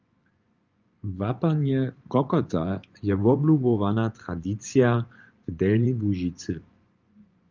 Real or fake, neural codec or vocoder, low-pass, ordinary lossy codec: fake; vocoder, 24 kHz, 100 mel bands, Vocos; 7.2 kHz; Opus, 32 kbps